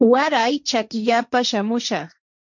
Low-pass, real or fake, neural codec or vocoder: 7.2 kHz; fake; codec, 16 kHz, 1.1 kbps, Voila-Tokenizer